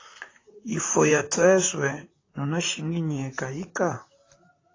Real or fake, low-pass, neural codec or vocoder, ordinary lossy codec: fake; 7.2 kHz; vocoder, 44.1 kHz, 128 mel bands, Pupu-Vocoder; AAC, 32 kbps